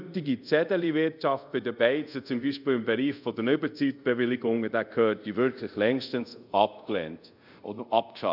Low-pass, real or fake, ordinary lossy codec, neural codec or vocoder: 5.4 kHz; fake; none; codec, 24 kHz, 0.5 kbps, DualCodec